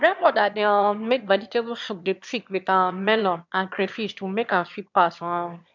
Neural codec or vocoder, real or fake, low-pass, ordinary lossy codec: autoencoder, 22.05 kHz, a latent of 192 numbers a frame, VITS, trained on one speaker; fake; 7.2 kHz; MP3, 64 kbps